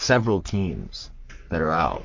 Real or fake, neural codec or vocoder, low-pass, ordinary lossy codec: fake; codec, 44.1 kHz, 3.4 kbps, Pupu-Codec; 7.2 kHz; MP3, 48 kbps